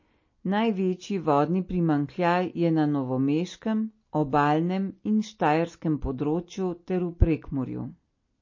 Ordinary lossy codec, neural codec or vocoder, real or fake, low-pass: MP3, 32 kbps; none; real; 7.2 kHz